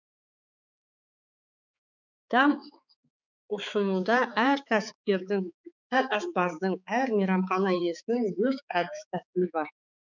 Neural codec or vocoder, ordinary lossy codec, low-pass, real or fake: codec, 16 kHz, 4 kbps, X-Codec, HuBERT features, trained on balanced general audio; none; 7.2 kHz; fake